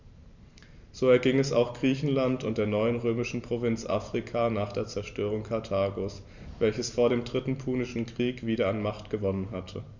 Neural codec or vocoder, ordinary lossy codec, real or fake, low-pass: vocoder, 44.1 kHz, 128 mel bands every 512 samples, BigVGAN v2; none; fake; 7.2 kHz